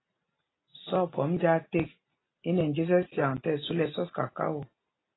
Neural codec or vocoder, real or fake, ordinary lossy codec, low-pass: none; real; AAC, 16 kbps; 7.2 kHz